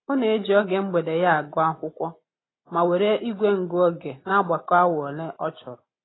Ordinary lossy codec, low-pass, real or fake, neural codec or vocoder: AAC, 16 kbps; 7.2 kHz; real; none